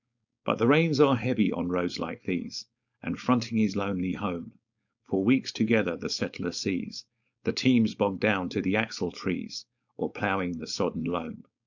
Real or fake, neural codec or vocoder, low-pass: fake; codec, 16 kHz, 4.8 kbps, FACodec; 7.2 kHz